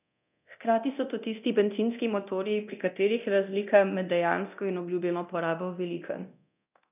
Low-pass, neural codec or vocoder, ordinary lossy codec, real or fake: 3.6 kHz; codec, 24 kHz, 0.9 kbps, DualCodec; none; fake